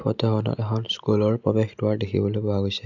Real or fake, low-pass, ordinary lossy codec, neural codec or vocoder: real; 7.2 kHz; none; none